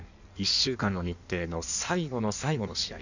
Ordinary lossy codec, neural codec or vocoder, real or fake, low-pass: none; codec, 16 kHz in and 24 kHz out, 1.1 kbps, FireRedTTS-2 codec; fake; 7.2 kHz